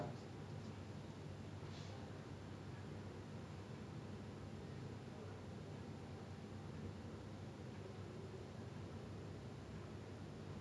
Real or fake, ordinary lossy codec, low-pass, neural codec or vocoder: real; none; none; none